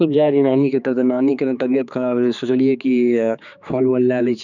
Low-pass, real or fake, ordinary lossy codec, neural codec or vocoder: 7.2 kHz; fake; none; codec, 16 kHz, 4 kbps, X-Codec, HuBERT features, trained on general audio